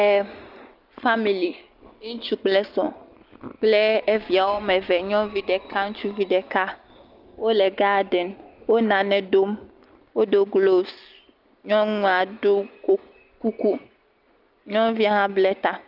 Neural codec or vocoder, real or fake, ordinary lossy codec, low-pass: none; real; Opus, 24 kbps; 5.4 kHz